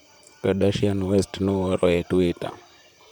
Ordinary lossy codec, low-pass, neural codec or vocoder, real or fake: none; none; vocoder, 44.1 kHz, 128 mel bands, Pupu-Vocoder; fake